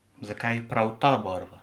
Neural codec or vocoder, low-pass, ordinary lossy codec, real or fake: codec, 44.1 kHz, 7.8 kbps, Pupu-Codec; 19.8 kHz; Opus, 32 kbps; fake